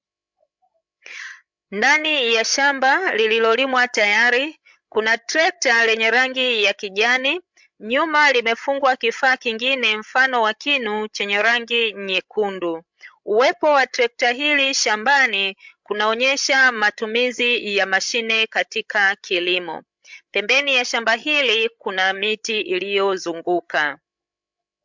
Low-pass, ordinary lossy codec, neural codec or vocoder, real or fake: 7.2 kHz; MP3, 64 kbps; codec, 16 kHz, 16 kbps, FreqCodec, larger model; fake